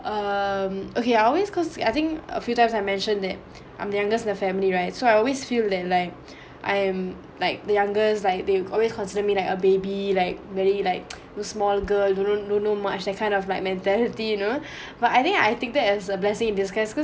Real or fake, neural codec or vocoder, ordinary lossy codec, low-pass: real; none; none; none